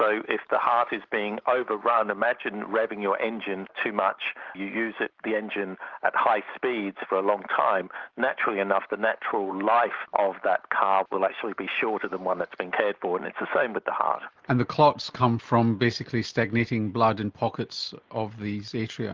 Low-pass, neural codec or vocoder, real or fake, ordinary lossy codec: 7.2 kHz; none; real; Opus, 32 kbps